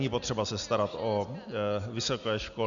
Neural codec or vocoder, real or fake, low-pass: none; real; 7.2 kHz